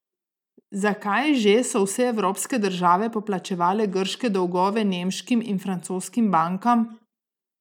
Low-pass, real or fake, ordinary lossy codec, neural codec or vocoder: 19.8 kHz; real; none; none